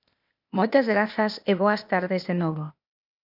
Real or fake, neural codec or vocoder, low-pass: fake; codec, 16 kHz, 0.8 kbps, ZipCodec; 5.4 kHz